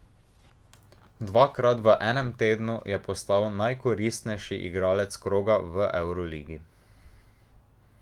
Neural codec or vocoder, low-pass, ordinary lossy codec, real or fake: autoencoder, 48 kHz, 128 numbers a frame, DAC-VAE, trained on Japanese speech; 19.8 kHz; Opus, 24 kbps; fake